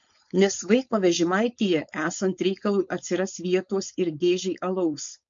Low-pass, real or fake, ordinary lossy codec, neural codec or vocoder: 7.2 kHz; fake; MP3, 48 kbps; codec, 16 kHz, 4.8 kbps, FACodec